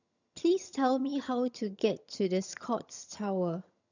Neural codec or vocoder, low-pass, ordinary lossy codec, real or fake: vocoder, 22.05 kHz, 80 mel bands, HiFi-GAN; 7.2 kHz; none; fake